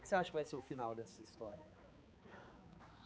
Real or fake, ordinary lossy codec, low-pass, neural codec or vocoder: fake; none; none; codec, 16 kHz, 4 kbps, X-Codec, HuBERT features, trained on balanced general audio